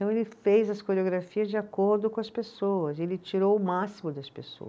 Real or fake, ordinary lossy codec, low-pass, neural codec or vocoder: real; none; none; none